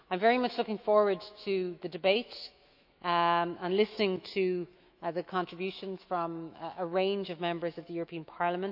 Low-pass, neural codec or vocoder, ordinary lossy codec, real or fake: 5.4 kHz; autoencoder, 48 kHz, 128 numbers a frame, DAC-VAE, trained on Japanese speech; none; fake